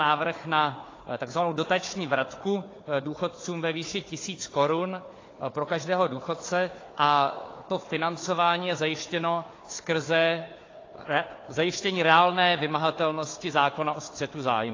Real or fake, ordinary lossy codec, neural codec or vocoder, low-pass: fake; AAC, 32 kbps; codec, 16 kHz, 4 kbps, FunCodec, trained on Chinese and English, 50 frames a second; 7.2 kHz